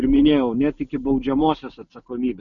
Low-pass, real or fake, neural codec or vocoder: 7.2 kHz; real; none